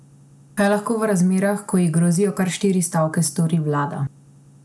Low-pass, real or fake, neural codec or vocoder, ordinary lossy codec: none; real; none; none